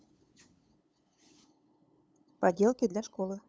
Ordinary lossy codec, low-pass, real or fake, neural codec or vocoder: none; none; fake; codec, 16 kHz, 8 kbps, FunCodec, trained on LibriTTS, 25 frames a second